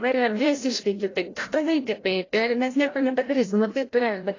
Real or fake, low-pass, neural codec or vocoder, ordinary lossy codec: fake; 7.2 kHz; codec, 16 kHz, 0.5 kbps, FreqCodec, larger model; AAC, 32 kbps